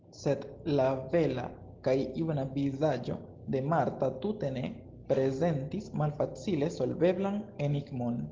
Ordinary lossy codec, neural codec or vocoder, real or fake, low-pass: Opus, 16 kbps; none; real; 7.2 kHz